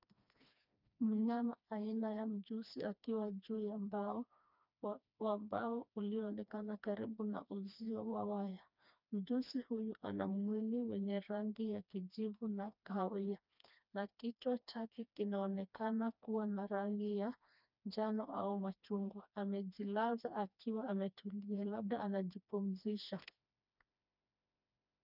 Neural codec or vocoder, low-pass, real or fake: codec, 16 kHz, 2 kbps, FreqCodec, smaller model; 5.4 kHz; fake